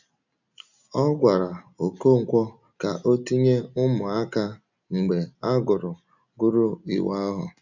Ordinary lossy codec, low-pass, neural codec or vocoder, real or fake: none; 7.2 kHz; none; real